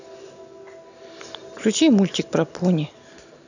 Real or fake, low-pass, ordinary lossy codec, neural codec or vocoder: real; 7.2 kHz; none; none